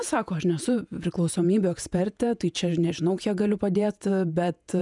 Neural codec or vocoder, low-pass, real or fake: vocoder, 48 kHz, 128 mel bands, Vocos; 10.8 kHz; fake